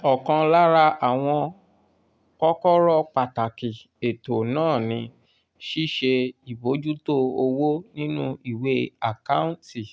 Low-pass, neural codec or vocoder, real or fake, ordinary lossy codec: none; none; real; none